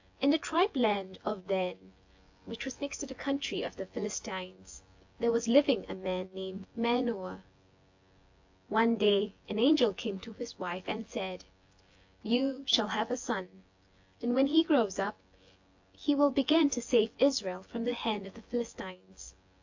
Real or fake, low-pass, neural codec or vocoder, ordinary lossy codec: fake; 7.2 kHz; vocoder, 24 kHz, 100 mel bands, Vocos; AAC, 48 kbps